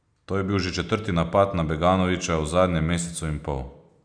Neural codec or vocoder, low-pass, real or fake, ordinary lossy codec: none; 9.9 kHz; real; none